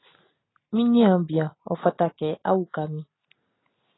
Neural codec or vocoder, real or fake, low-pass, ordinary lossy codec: none; real; 7.2 kHz; AAC, 16 kbps